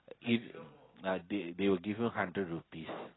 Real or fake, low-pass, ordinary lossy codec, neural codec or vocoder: real; 7.2 kHz; AAC, 16 kbps; none